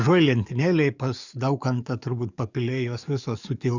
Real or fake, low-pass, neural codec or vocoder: fake; 7.2 kHz; codec, 44.1 kHz, 7.8 kbps, DAC